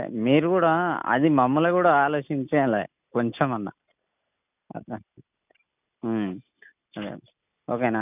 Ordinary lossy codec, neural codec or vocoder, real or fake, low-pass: none; none; real; 3.6 kHz